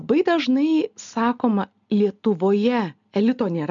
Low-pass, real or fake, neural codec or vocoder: 7.2 kHz; real; none